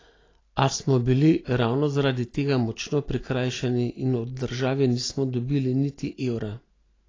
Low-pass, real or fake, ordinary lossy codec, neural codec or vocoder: 7.2 kHz; real; AAC, 32 kbps; none